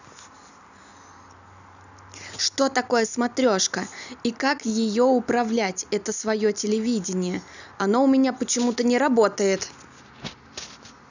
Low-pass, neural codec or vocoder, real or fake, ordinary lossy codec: 7.2 kHz; none; real; none